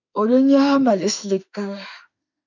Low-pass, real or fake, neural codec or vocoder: 7.2 kHz; fake; autoencoder, 48 kHz, 32 numbers a frame, DAC-VAE, trained on Japanese speech